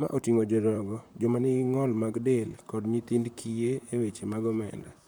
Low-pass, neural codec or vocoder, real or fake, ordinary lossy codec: none; vocoder, 44.1 kHz, 128 mel bands, Pupu-Vocoder; fake; none